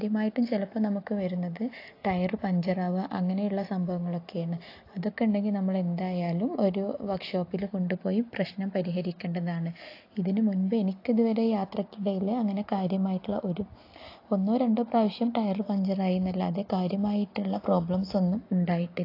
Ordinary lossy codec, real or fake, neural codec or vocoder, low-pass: AAC, 32 kbps; real; none; 5.4 kHz